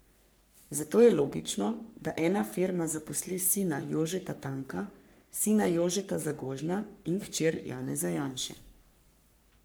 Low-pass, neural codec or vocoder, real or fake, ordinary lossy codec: none; codec, 44.1 kHz, 3.4 kbps, Pupu-Codec; fake; none